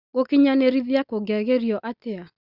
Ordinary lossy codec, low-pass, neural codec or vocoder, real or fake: Opus, 64 kbps; 5.4 kHz; none; real